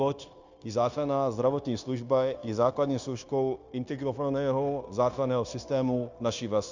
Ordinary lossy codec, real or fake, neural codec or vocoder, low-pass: Opus, 64 kbps; fake; codec, 16 kHz, 0.9 kbps, LongCat-Audio-Codec; 7.2 kHz